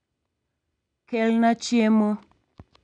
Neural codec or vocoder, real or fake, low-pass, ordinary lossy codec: none; real; 9.9 kHz; none